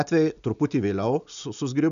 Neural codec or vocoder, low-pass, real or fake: none; 7.2 kHz; real